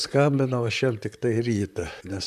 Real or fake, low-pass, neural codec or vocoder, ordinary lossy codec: fake; 14.4 kHz; vocoder, 44.1 kHz, 128 mel bands, Pupu-Vocoder; MP3, 96 kbps